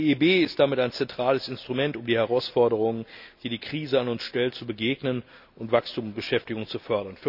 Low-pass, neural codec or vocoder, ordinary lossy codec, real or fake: 5.4 kHz; none; none; real